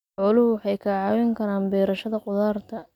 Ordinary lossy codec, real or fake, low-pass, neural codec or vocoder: none; real; 19.8 kHz; none